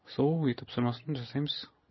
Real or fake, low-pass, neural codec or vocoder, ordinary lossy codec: real; 7.2 kHz; none; MP3, 24 kbps